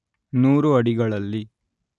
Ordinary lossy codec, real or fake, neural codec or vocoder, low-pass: none; real; none; 10.8 kHz